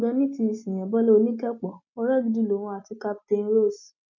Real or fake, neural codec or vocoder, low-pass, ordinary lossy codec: real; none; 7.2 kHz; MP3, 48 kbps